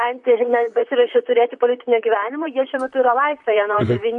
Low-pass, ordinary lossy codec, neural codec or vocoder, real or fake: 10.8 kHz; MP3, 64 kbps; vocoder, 44.1 kHz, 128 mel bands, Pupu-Vocoder; fake